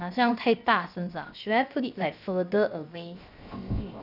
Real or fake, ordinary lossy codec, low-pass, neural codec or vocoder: fake; none; 5.4 kHz; codec, 16 kHz, 0.7 kbps, FocalCodec